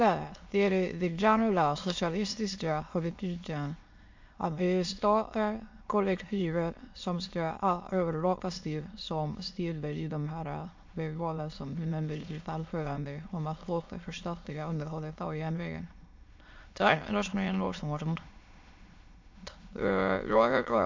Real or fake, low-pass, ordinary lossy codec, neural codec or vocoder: fake; 7.2 kHz; MP3, 48 kbps; autoencoder, 22.05 kHz, a latent of 192 numbers a frame, VITS, trained on many speakers